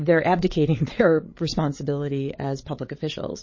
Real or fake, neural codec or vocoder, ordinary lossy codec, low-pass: fake; codec, 16 kHz, 8 kbps, FreqCodec, larger model; MP3, 32 kbps; 7.2 kHz